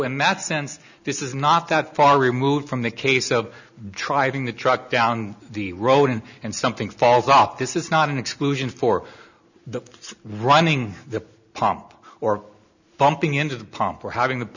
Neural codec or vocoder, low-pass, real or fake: none; 7.2 kHz; real